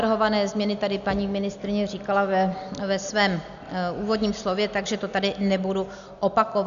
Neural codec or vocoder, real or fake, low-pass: none; real; 7.2 kHz